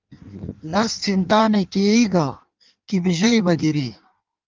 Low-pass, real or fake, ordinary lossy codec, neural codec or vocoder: 7.2 kHz; fake; Opus, 32 kbps; codec, 16 kHz in and 24 kHz out, 1.1 kbps, FireRedTTS-2 codec